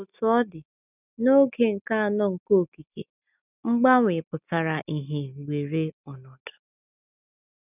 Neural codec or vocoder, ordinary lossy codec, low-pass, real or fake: none; none; 3.6 kHz; real